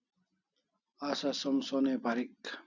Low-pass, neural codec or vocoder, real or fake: 7.2 kHz; none; real